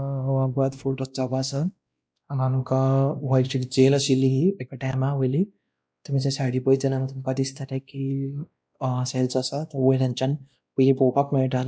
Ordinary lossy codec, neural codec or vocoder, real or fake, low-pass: none; codec, 16 kHz, 1 kbps, X-Codec, WavLM features, trained on Multilingual LibriSpeech; fake; none